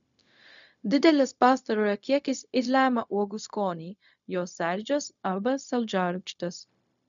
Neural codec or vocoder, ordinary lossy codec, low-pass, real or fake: codec, 16 kHz, 0.4 kbps, LongCat-Audio-Codec; MP3, 96 kbps; 7.2 kHz; fake